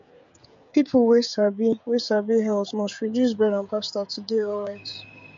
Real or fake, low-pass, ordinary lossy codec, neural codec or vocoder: fake; 7.2 kHz; MP3, 48 kbps; codec, 16 kHz, 16 kbps, FreqCodec, smaller model